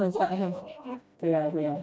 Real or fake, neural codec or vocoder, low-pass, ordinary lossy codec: fake; codec, 16 kHz, 1 kbps, FreqCodec, smaller model; none; none